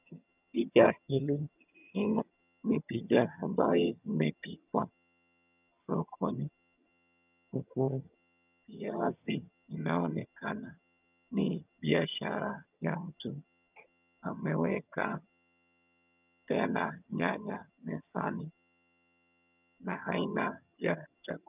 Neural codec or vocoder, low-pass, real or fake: vocoder, 22.05 kHz, 80 mel bands, HiFi-GAN; 3.6 kHz; fake